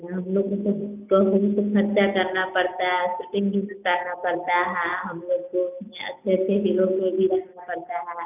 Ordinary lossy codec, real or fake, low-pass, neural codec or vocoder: none; real; 3.6 kHz; none